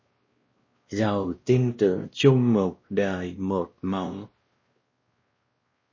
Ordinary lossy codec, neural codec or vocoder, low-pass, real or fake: MP3, 32 kbps; codec, 16 kHz, 1 kbps, X-Codec, WavLM features, trained on Multilingual LibriSpeech; 7.2 kHz; fake